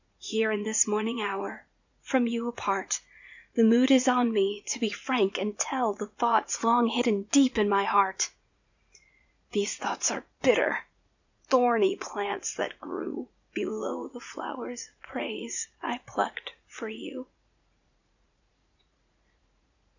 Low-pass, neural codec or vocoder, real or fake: 7.2 kHz; vocoder, 44.1 kHz, 80 mel bands, Vocos; fake